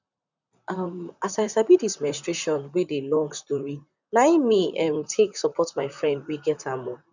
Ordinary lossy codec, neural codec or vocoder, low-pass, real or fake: none; vocoder, 44.1 kHz, 128 mel bands, Pupu-Vocoder; 7.2 kHz; fake